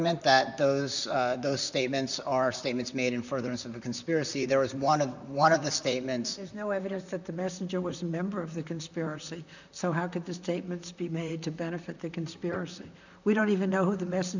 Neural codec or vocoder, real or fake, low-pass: vocoder, 44.1 kHz, 128 mel bands, Pupu-Vocoder; fake; 7.2 kHz